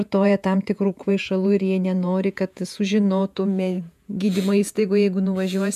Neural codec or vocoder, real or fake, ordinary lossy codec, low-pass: vocoder, 48 kHz, 128 mel bands, Vocos; fake; MP3, 96 kbps; 14.4 kHz